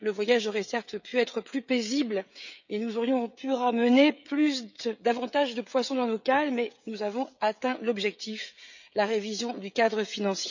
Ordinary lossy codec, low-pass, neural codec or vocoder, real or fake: none; 7.2 kHz; codec, 16 kHz, 16 kbps, FreqCodec, smaller model; fake